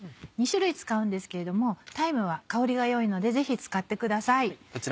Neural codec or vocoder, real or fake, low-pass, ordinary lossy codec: none; real; none; none